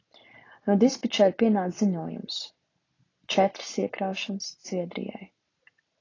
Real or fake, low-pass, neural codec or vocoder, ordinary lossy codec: real; 7.2 kHz; none; AAC, 32 kbps